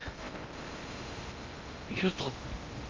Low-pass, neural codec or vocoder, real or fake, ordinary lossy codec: 7.2 kHz; codec, 16 kHz in and 24 kHz out, 0.6 kbps, FocalCodec, streaming, 4096 codes; fake; Opus, 32 kbps